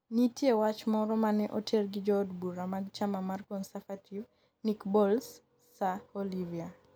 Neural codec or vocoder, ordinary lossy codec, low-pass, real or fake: none; none; none; real